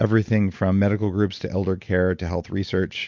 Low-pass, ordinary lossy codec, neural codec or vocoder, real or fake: 7.2 kHz; MP3, 64 kbps; none; real